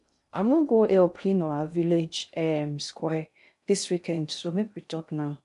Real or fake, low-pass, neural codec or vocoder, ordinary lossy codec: fake; 10.8 kHz; codec, 16 kHz in and 24 kHz out, 0.6 kbps, FocalCodec, streaming, 2048 codes; none